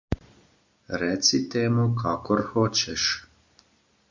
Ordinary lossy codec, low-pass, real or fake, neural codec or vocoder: MP3, 48 kbps; 7.2 kHz; real; none